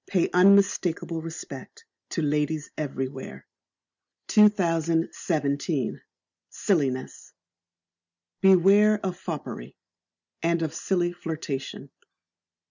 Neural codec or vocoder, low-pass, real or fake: none; 7.2 kHz; real